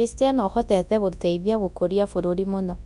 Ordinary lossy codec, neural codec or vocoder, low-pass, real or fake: none; codec, 24 kHz, 0.9 kbps, WavTokenizer, large speech release; 10.8 kHz; fake